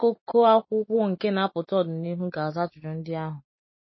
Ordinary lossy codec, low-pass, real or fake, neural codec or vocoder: MP3, 24 kbps; 7.2 kHz; real; none